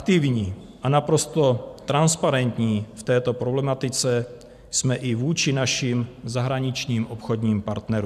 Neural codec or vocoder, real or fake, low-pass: vocoder, 44.1 kHz, 128 mel bands every 512 samples, BigVGAN v2; fake; 14.4 kHz